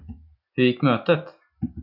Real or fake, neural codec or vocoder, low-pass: real; none; 5.4 kHz